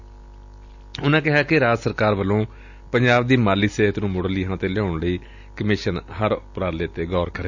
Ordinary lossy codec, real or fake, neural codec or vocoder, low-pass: Opus, 64 kbps; real; none; 7.2 kHz